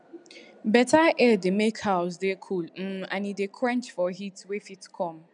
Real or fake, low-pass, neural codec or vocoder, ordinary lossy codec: real; 9.9 kHz; none; none